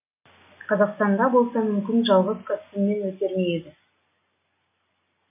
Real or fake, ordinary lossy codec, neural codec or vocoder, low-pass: real; AAC, 32 kbps; none; 3.6 kHz